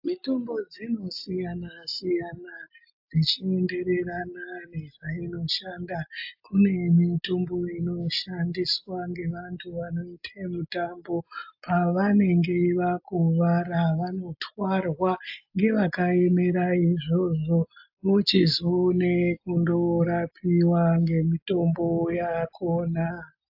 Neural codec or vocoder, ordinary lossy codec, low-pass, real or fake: none; AAC, 48 kbps; 5.4 kHz; real